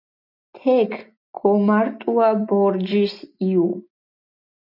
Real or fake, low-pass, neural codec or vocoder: real; 5.4 kHz; none